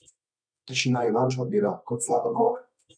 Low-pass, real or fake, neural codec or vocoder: 9.9 kHz; fake; codec, 24 kHz, 0.9 kbps, WavTokenizer, medium music audio release